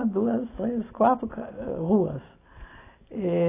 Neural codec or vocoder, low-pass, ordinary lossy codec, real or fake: none; 3.6 kHz; AAC, 16 kbps; real